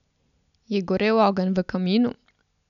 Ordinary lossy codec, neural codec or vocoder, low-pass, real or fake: none; none; 7.2 kHz; real